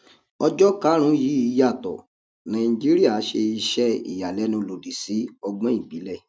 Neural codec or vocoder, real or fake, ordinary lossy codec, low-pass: none; real; none; none